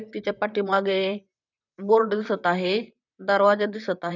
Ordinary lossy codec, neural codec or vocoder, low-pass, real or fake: none; vocoder, 44.1 kHz, 128 mel bands, Pupu-Vocoder; 7.2 kHz; fake